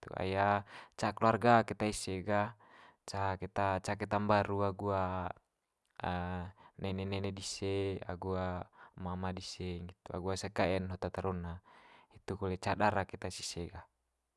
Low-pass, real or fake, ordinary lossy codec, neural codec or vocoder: none; real; none; none